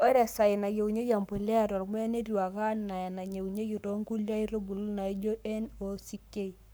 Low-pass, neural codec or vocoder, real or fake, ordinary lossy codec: none; codec, 44.1 kHz, 7.8 kbps, Pupu-Codec; fake; none